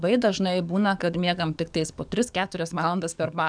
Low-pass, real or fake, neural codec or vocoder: 9.9 kHz; fake; codec, 44.1 kHz, 7.8 kbps, DAC